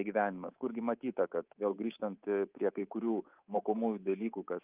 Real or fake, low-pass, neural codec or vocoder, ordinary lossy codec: fake; 3.6 kHz; codec, 24 kHz, 3.1 kbps, DualCodec; Opus, 24 kbps